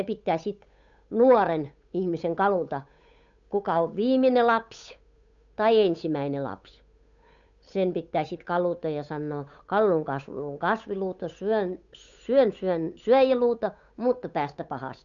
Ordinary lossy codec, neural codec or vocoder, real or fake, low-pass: none; codec, 16 kHz, 8 kbps, FunCodec, trained on Chinese and English, 25 frames a second; fake; 7.2 kHz